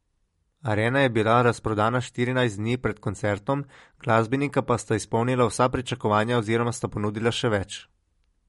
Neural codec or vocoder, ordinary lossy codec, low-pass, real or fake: none; MP3, 48 kbps; 19.8 kHz; real